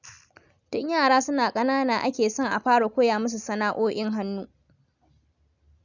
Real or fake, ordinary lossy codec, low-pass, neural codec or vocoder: real; none; 7.2 kHz; none